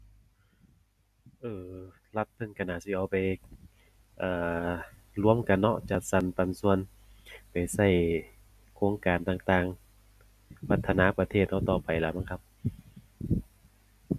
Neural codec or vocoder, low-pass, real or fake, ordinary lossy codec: none; 14.4 kHz; real; none